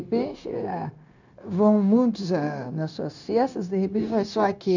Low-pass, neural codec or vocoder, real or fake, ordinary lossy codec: 7.2 kHz; codec, 16 kHz, 0.9 kbps, LongCat-Audio-Codec; fake; none